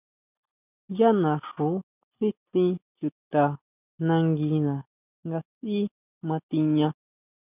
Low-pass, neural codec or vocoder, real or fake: 3.6 kHz; none; real